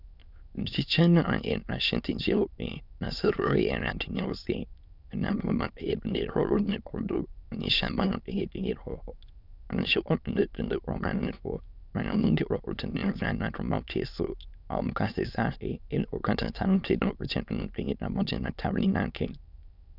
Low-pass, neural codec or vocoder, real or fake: 5.4 kHz; autoencoder, 22.05 kHz, a latent of 192 numbers a frame, VITS, trained on many speakers; fake